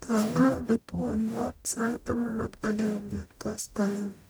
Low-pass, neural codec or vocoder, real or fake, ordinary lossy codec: none; codec, 44.1 kHz, 0.9 kbps, DAC; fake; none